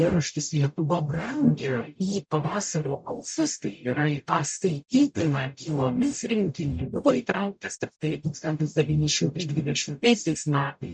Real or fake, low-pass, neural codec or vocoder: fake; 9.9 kHz; codec, 44.1 kHz, 0.9 kbps, DAC